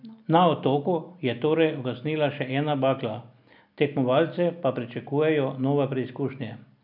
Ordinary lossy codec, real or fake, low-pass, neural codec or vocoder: none; real; 5.4 kHz; none